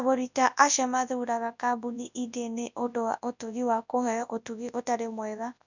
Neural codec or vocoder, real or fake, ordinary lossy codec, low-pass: codec, 24 kHz, 0.9 kbps, WavTokenizer, large speech release; fake; none; 7.2 kHz